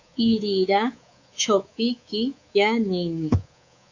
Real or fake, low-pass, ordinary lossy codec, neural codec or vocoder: fake; 7.2 kHz; AAC, 48 kbps; codec, 24 kHz, 3.1 kbps, DualCodec